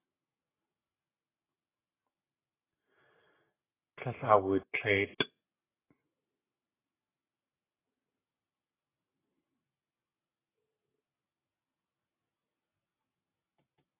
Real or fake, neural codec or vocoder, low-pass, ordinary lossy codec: real; none; 3.6 kHz; AAC, 16 kbps